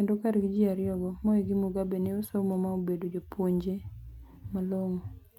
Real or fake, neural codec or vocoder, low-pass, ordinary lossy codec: real; none; 19.8 kHz; MP3, 96 kbps